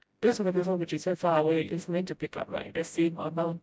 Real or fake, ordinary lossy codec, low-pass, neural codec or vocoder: fake; none; none; codec, 16 kHz, 0.5 kbps, FreqCodec, smaller model